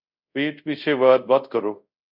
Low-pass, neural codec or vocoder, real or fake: 5.4 kHz; codec, 24 kHz, 0.5 kbps, DualCodec; fake